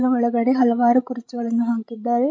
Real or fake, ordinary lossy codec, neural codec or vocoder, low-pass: fake; none; codec, 16 kHz, 16 kbps, FreqCodec, larger model; none